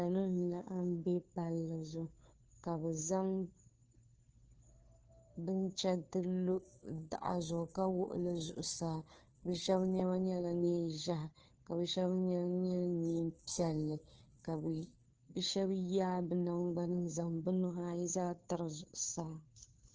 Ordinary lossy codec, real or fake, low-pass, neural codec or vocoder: Opus, 16 kbps; fake; 7.2 kHz; codec, 16 kHz, 2 kbps, FunCodec, trained on Chinese and English, 25 frames a second